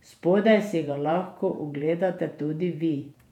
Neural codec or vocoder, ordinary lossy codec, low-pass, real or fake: vocoder, 48 kHz, 128 mel bands, Vocos; none; 19.8 kHz; fake